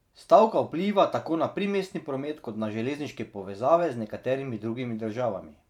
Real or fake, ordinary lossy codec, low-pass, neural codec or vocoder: fake; none; 19.8 kHz; vocoder, 44.1 kHz, 128 mel bands every 512 samples, BigVGAN v2